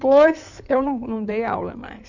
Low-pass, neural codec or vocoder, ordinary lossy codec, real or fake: 7.2 kHz; codec, 16 kHz in and 24 kHz out, 2.2 kbps, FireRedTTS-2 codec; none; fake